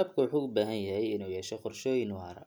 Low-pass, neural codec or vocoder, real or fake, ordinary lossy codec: none; none; real; none